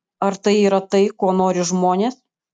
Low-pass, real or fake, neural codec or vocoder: 10.8 kHz; real; none